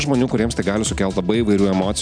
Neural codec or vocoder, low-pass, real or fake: none; 9.9 kHz; real